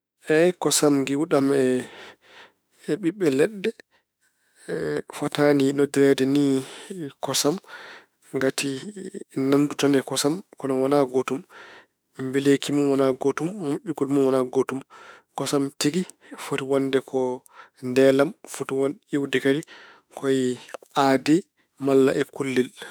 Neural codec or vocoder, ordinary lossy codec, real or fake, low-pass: autoencoder, 48 kHz, 32 numbers a frame, DAC-VAE, trained on Japanese speech; none; fake; none